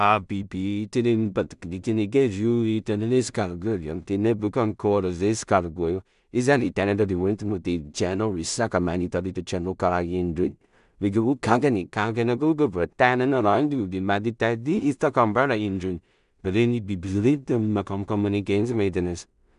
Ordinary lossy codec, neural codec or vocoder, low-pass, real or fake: none; codec, 16 kHz in and 24 kHz out, 0.4 kbps, LongCat-Audio-Codec, two codebook decoder; 10.8 kHz; fake